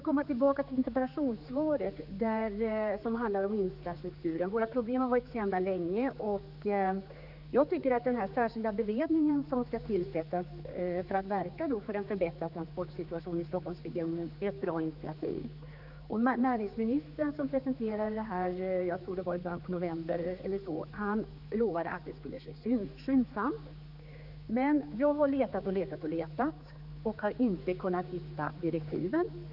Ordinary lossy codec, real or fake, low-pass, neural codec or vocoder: none; fake; 5.4 kHz; codec, 16 kHz, 4 kbps, X-Codec, HuBERT features, trained on general audio